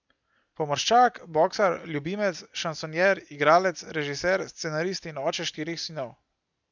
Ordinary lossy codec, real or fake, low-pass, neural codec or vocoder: none; real; 7.2 kHz; none